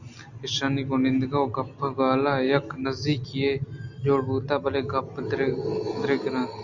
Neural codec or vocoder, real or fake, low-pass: none; real; 7.2 kHz